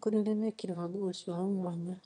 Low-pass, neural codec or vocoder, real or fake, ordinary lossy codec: 9.9 kHz; autoencoder, 22.05 kHz, a latent of 192 numbers a frame, VITS, trained on one speaker; fake; none